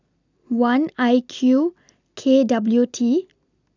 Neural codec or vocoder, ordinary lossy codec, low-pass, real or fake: none; none; 7.2 kHz; real